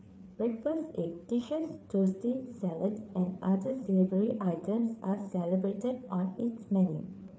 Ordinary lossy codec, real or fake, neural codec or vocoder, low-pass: none; fake; codec, 16 kHz, 4 kbps, FreqCodec, larger model; none